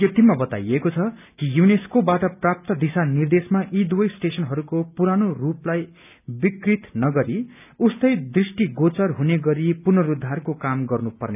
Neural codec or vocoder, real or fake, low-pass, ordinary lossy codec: none; real; 3.6 kHz; none